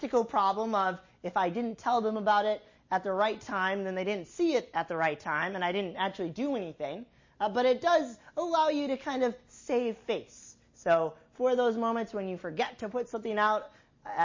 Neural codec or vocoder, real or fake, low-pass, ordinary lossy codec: none; real; 7.2 kHz; MP3, 32 kbps